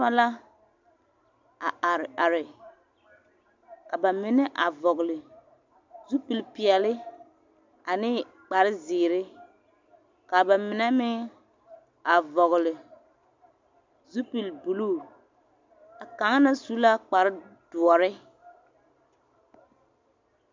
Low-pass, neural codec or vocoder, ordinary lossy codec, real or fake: 7.2 kHz; none; MP3, 64 kbps; real